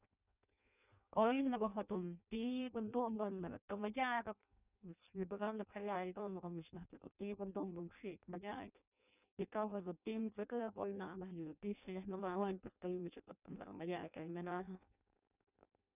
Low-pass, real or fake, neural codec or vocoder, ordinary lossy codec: 3.6 kHz; fake; codec, 16 kHz in and 24 kHz out, 0.6 kbps, FireRedTTS-2 codec; none